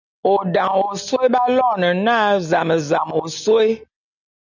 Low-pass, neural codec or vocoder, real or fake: 7.2 kHz; none; real